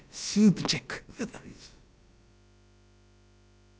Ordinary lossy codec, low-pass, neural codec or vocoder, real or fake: none; none; codec, 16 kHz, about 1 kbps, DyCAST, with the encoder's durations; fake